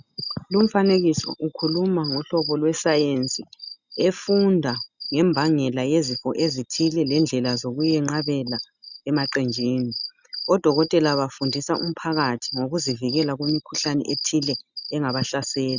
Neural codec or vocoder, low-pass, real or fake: none; 7.2 kHz; real